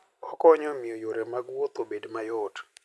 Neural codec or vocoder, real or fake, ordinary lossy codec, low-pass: none; real; none; none